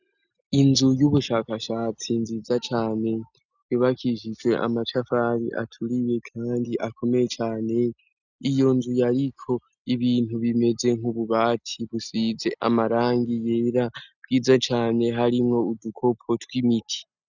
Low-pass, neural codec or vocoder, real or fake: 7.2 kHz; none; real